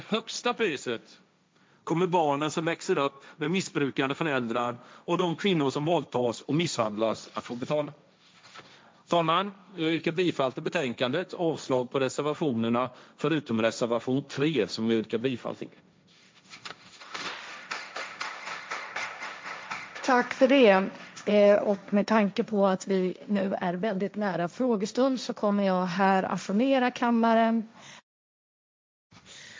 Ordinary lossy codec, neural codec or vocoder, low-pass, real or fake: none; codec, 16 kHz, 1.1 kbps, Voila-Tokenizer; none; fake